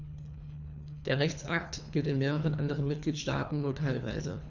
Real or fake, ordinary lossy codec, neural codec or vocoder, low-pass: fake; none; codec, 24 kHz, 3 kbps, HILCodec; 7.2 kHz